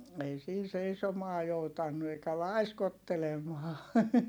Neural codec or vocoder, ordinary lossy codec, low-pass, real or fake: none; none; none; real